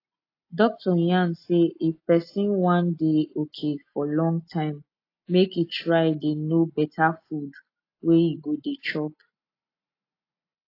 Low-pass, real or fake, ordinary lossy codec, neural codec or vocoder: 5.4 kHz; real; AAC, 32 kbps; none